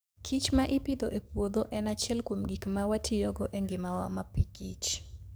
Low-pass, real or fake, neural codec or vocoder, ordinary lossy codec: none; fake; codec, 44.1 kHz, 7.8 kbps, DAC; none